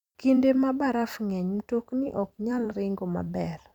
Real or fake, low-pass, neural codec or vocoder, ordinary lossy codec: fake; 19.8 kHz; vocoder, 44.1 kHz, 128 mel bands every 256 samples, BigVGAN v2; none